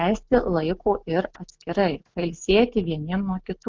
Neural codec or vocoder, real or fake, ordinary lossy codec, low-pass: none; real; Opus, 32 kbps; 7.2 kHz